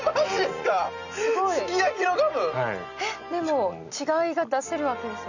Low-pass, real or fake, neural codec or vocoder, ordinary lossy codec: 7.2 kHz; real; none; none